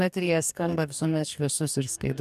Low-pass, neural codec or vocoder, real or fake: 14.4 kHz; codec, 44.1 kHz, 2.6 kbps, DAC; fake